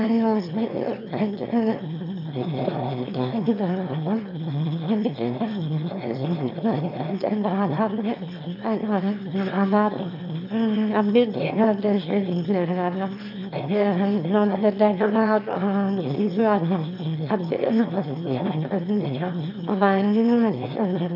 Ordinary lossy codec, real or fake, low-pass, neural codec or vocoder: MP3, 48 kbps; fake; 5.4 kHz; autoencoder, 22.05 kHz, a latent of 192 numbers a frame, VITS, trained on one speaker